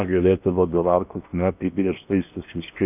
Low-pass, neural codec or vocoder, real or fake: 3.6 kHz; codec, 16 kHz in and 24 kHz out, 0.8 kbps, FocalCodec, streaming, 65536 codes; fake